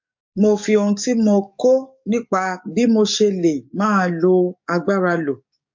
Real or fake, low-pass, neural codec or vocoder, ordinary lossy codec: fake; 7.2 kHz; codec, 44.1 kHz, 7.8 kbps, DAC; MP3, 48 kbps